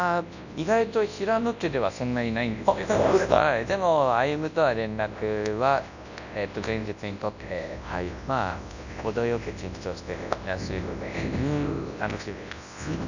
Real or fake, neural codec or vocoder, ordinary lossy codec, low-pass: fake; codec, 24 kHz, 0.9 kbps, WavTokenizer, large speech release; none; 7.2 kHz